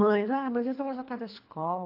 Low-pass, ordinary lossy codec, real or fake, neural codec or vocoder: 5.4 kHz; AAC, 32 kbps; fake; codec, 24 kHz, 3 kbps, HILCodec